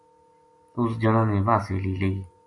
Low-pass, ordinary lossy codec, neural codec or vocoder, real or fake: 10.8 kHz; AAC, 64 kbps; none; real